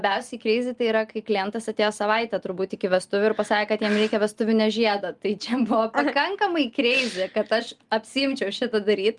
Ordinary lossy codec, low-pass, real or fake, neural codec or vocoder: Opus, 32 kbps; 10.8 kHz; real; none